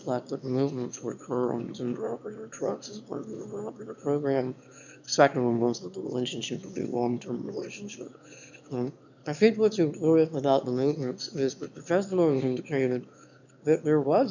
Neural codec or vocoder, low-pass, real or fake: autoencoder, 22.05 kHz, a latent of 192 numbers a frame, VITS, trained on one speaker; 7.2 kHz; fake